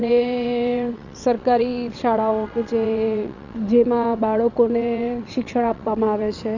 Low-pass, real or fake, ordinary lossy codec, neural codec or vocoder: 7.2 kHz; fake; none; vocoder, 22.05 kHz, 80 mel bands, WaveNeXt